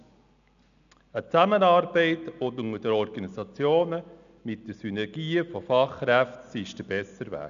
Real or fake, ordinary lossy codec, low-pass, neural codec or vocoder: real; none; 7.2 kHz; none